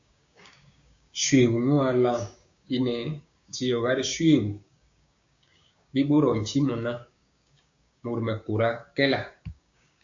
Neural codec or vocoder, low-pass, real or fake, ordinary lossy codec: codec, 16 kHz, 6 kbps, DAC; 7.2 kHz; fake; AAC, 64 kbps